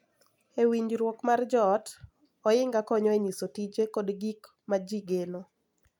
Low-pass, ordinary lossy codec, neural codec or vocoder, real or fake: 19.8 kHz; none; none; real